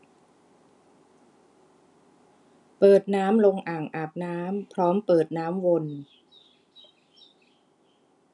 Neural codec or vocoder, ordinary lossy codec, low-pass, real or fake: none; none; 10.8 kHz; real